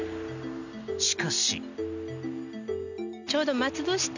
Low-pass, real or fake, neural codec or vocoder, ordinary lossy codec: 7.2 kHz; real; none; none